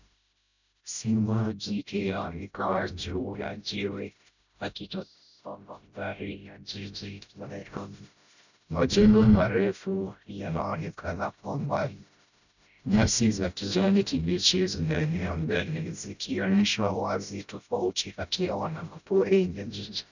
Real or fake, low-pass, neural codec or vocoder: fake; 7.2 kHz; codec, 16 kHz, 0.5 kbps, FreqCodec, smaller model